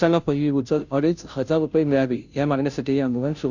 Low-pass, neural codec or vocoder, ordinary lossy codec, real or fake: 7.2 kHz; codec, 16 kHz, 0.5 kbps, FunCodec, trained on Chinese and English, 25 frames a second; none; fake